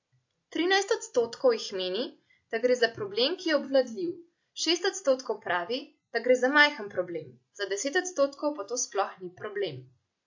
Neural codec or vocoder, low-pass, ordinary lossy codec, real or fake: none; 7.2 kHz; AAC, 48 kbps; real